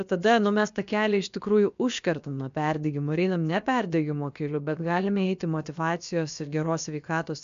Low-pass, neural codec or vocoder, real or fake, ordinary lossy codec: 7.2 kHz; codec, 16 kHz, about 1 kbps, DyCAST, with the encoder's durations; fake; MP3, 64 kbps